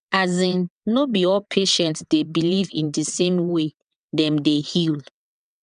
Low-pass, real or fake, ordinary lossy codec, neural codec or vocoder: 9.9 kHz; fake; none; vocoder, 22.05 kHz, 80 mel bands, WaveNeXt